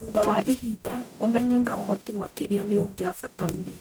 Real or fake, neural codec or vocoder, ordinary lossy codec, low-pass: fake; codec, 44.1 kHz, 0.9 kbps, DAC; none; none